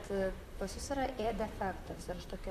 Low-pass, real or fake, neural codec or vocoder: 14.4 kHz; fake; vocoder, 44.1 kHz, 128 mel bands, Pupu-Vocoder